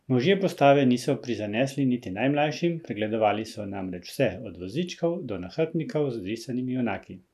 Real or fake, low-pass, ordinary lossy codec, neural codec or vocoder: real; 14.4 kHz; none; none